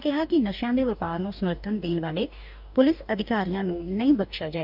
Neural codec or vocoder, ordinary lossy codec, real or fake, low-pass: codec, 44.1 kHz, 2.6 kbps, DAC; none; fake; 5.4 kHz